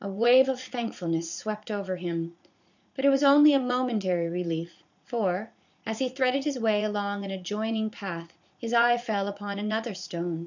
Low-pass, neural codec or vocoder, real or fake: 7.2 kHz; vocoder, 44.1 kHz, 80 mel bands, Vocos; fake